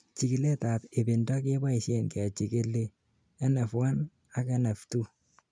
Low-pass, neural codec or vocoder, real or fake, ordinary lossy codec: 9.9 kHz; none; real; none